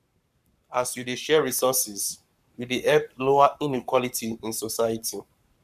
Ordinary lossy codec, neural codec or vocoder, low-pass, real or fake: none; codec, 44.1 kHz, 7.8 kbps, Pupu-Codec; 14.4 kHz; fake